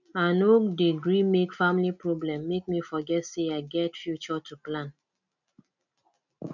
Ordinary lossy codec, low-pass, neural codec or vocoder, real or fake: none; 7.2 kHz; none; real